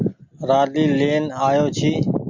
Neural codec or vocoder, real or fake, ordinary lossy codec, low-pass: none; real; MP3, 48 kbps; 7.2 kHz